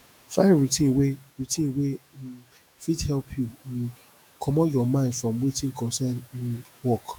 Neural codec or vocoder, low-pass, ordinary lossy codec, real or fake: autoencoder, 48 kHz, 128 numbers a frame, DAC-VAE, trained on Japanese speech; none; none; fake